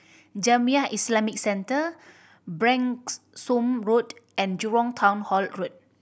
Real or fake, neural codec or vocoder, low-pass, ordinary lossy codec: real; none; none; none